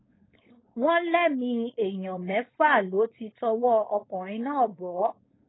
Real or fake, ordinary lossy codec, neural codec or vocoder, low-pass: fake; AAC, 16 kbps; codec, 16 kHz, 4.8 kbps, FACodec; 7.2 kHz